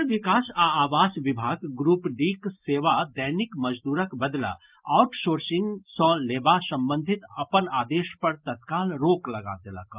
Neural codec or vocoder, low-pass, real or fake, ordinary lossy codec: none; 3.6 kHz; real; Opus, 24 kbps